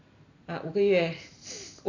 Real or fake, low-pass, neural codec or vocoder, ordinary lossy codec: real; 7.2 kHz; none; none